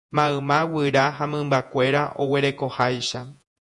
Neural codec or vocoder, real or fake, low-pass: vocoder, 48 kHz, 128 mel bands, Vocos; fake; 10.8 kHz